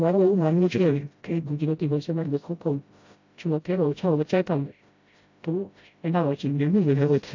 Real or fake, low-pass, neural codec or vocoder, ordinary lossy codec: fake; 7.2 kHz; codec, 16 kHz, 0.5 kbps, FreqCodec, smaller model; AAC, 48 kbps